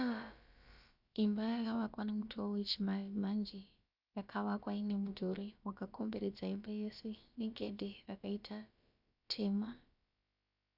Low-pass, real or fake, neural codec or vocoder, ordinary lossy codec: 5.4 kHz; fake; codec, 16 kHz, about 1 kbps, DyCAST, with the encoder's durations; Opus, 64 kbps